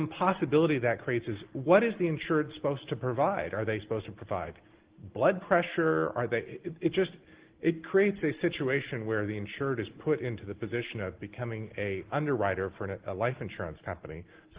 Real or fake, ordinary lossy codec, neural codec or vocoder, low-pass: real; Opus, 16 kbps; none; 3.6 kHz